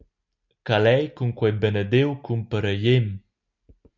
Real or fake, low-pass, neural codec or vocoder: real; 7.2 kHz; none